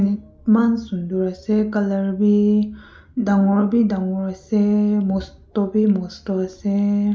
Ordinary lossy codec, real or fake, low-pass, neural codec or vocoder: none; real; none; none